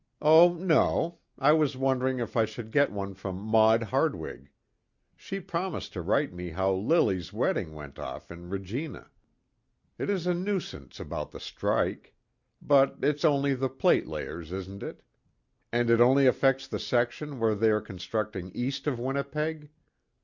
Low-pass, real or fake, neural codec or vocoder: 7.2 kHz; real; none